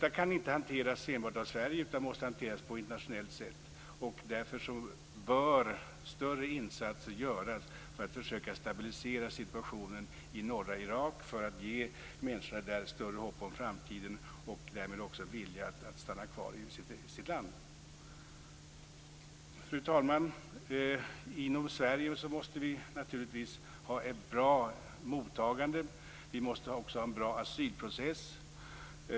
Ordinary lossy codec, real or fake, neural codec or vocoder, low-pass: none; real; none; none